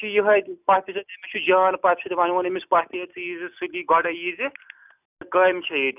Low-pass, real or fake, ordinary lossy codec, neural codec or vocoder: 3.6 kHz; real; none; none